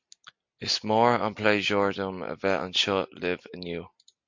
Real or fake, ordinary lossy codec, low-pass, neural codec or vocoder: real; MP3, 48 kbps; 7.2 kHz; none